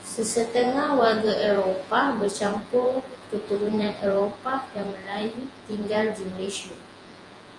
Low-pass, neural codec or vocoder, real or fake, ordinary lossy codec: 10.8 kHz; vocoder, 48 kHz, 128 mel bands, Vocos; fake; Opus, 32 kbps